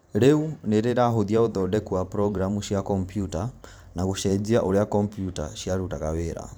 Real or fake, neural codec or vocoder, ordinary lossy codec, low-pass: fake; vocoder, 44.1 kHz, 128 mel bands every 512 samples, BigVGAN v2; none; none